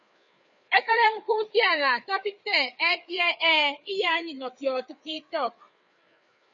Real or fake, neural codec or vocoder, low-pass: fake; codec, 16 kHz, 4 kbps, FreqCodec, larger model; 7.2 kHz